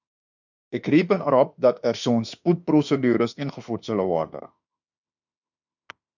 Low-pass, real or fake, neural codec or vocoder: 7.2 kHz; fake; autoencoder, 48 kHz, 32 numbers a frame, DAC-VAE, trained on Japanese speech